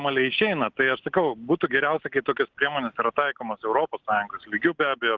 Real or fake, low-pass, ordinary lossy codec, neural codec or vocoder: real; 7.2 kHz; Opus, 16 kbps; none